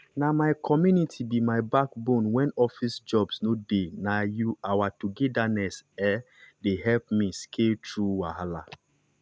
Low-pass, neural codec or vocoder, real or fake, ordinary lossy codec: none; none; real; none